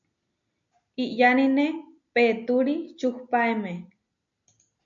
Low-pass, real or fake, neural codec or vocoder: 7.2 kHz; real; none